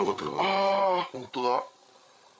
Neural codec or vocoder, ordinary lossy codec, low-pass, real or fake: codec, 16 kHz, 8 kbps, FreqCodec, larger model; none; none; fake